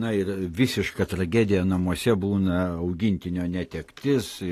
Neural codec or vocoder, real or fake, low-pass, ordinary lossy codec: none; real; 14.4 kHz; AAC, 48 kbps